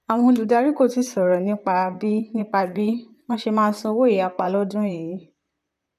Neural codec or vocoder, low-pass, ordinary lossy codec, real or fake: vocoder, 44.1 kHz, 128 mel bands, Pupu-Vocoder; 14.4 kHz; none; fake